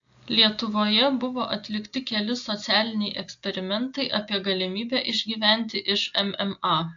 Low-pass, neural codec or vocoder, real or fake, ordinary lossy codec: 7.2 kHz; none; real; AAC, 64 kbps